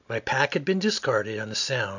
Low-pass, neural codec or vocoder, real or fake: 7.2 kHz; none; real